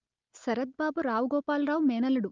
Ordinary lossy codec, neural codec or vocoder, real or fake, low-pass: Opus, 24 kbps; none; real; 7.2 kHz